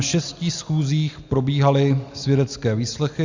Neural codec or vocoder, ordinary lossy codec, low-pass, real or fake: none; Opus, 64 kbps; 7.2 kHz; real